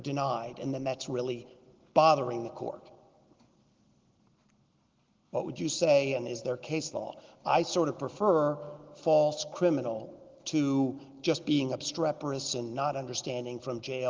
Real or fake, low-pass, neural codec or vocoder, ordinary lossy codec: real; 7.2 kHz; none; Opus, 16 kbps